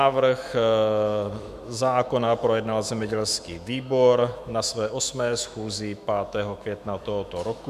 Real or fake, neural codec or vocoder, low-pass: fake; autoencoder, 48 kHz, 128 numbers a frame, DAC-VAE, trained on Japanese speech; 14.4 kHz